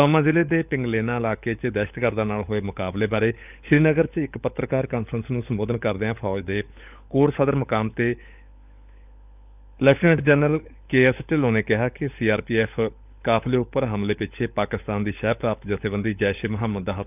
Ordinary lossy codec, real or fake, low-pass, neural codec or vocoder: none; fake; 3.6 kHz; codec, 16 kHz, 16 kbps, FunCodec, trained on LibriTTS, 50 frames a second